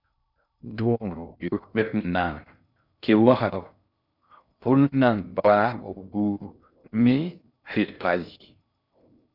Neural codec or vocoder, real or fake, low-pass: codec, 16 kHz in and 24 kHz out, 0.6 kbps, FocalCodec, streaming, 2048 codes; fake; 5.4 kHz